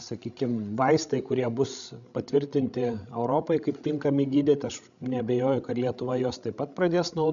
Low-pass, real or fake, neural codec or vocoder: 7.2 kHz; fake; codec, 16 kHz, 16 kbps, FreqCodec, larger model